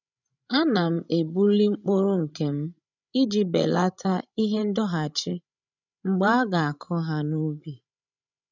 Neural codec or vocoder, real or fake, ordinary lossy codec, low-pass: codec, 16 kHz, 16 kbps, FreqCodec, larger model; fake; none; 7.2 kHz